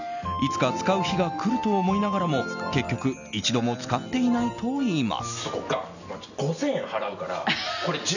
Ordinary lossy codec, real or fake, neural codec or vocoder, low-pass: none; real; none; 7.2 kHz